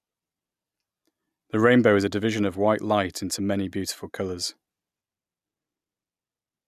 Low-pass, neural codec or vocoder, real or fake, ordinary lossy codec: 14.4 kHz; none; real; none